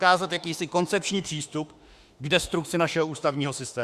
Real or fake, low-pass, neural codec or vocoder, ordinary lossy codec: fake; 14.4 kHz; autoencoder, 48 kHz, 32 numbers a frame, DAC-VAE, trained on Japanese speech; Opus, 64 kbps